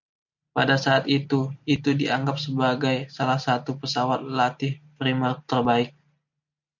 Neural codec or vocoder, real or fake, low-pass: none; real; 7.2 kHz